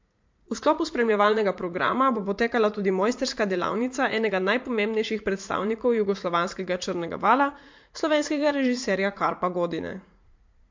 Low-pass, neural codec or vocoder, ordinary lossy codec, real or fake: 7.2 kHz; vocoder, 44.1 kHz, 80 mel bands, Vocos; MP3, 48 kbps; fake